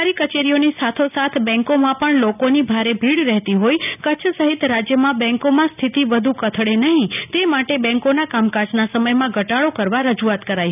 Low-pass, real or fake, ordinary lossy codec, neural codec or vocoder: 3.6 kHz; real; none; none